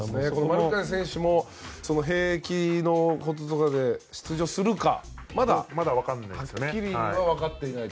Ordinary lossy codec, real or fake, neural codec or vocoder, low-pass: none; real; none; none